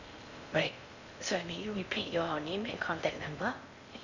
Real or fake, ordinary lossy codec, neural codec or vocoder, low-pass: fake; none; codec, 16 kHz in and 24 kHz out, 0.6 kbps, FocalCodec, streaming, 4096 codes; 7.2 kHz